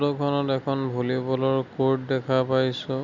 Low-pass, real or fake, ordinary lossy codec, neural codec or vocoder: 7.2 kHz; real; Opus, 64 kbps; none